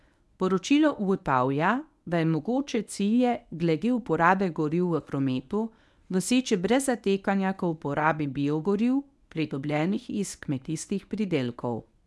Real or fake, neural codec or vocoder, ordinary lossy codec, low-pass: fake; codec, 24 kHz, 0.9 kbps, WavTokenizer, medium speech release version 1; none; none